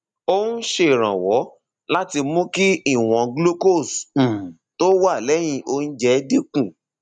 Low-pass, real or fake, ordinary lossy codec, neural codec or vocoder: 9.9 kHz; real; none; none